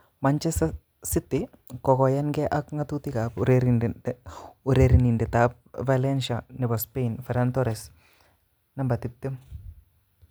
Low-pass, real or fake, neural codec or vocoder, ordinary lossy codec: none; real; none; none